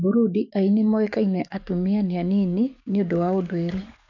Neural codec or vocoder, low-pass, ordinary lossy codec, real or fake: codec, 44.1 kHz, 7.8 kbps, DAC; 7.2 kHz; none; fake